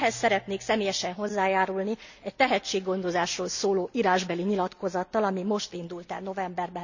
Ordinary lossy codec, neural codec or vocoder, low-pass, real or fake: none; none; 7.2 kHz; real